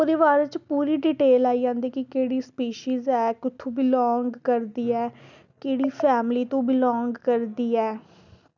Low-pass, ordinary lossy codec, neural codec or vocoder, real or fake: 7.2 kHz; none; none; real